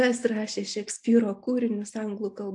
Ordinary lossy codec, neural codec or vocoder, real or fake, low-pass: AAC, 64 kbps; none; real; 10.8 kHz